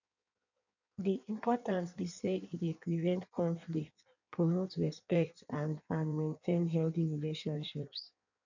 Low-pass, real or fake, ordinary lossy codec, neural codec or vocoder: 7.2 kHz; fake; none; codec, 16 kHz in and 24 kHz out, 1.1 kbps, FireRedTTS-2 codec